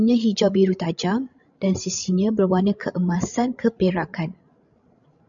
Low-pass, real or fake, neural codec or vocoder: 7.2 kHz; fake; codec, 16 kHz, 16 kbps, FreqCodec, larger model